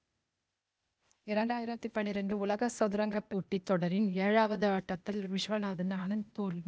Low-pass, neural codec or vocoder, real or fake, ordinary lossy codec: none; codec, 16 kHz, 0.8 kbps, ZipCodec; fake; none